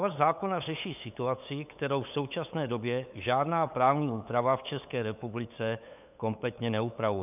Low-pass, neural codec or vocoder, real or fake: 3.6 kHz; codec, 16 kHz, 8 kbps, FunCodec, trained on LibriTTS, 25 frames a second; fake